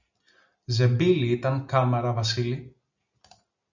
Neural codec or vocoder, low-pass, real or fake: none; 7.2 kHz; real